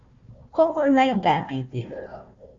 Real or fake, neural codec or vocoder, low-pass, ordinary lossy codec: fake; codec, 16 kHz, 1 kbps, FunCodec, trained on Chinese and English, 50 frames a second; 7.2 kHz; Opus, 64 kbps